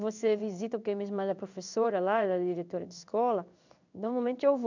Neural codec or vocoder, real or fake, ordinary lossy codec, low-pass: codec, 16 kHz in and 24 kHz out, 1 kbps, XY-Tokenizer; fake; none; 7.2 kHz